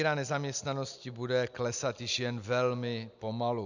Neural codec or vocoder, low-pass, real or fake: none; 7.2 kHz; real